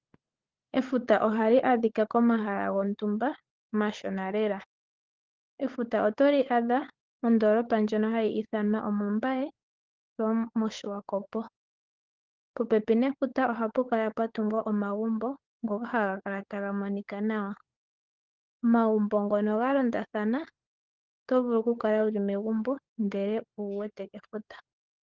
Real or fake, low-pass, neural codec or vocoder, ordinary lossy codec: fake; 7.2 kHz; codec, 16 kHz, 16 kbps, FunCodec, trained on LibriTTS, 50 frames a second; Opus, 16 kbps